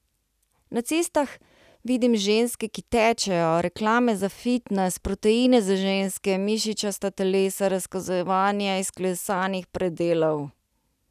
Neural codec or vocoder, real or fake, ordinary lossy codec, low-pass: none; real; none; 14.4 kHz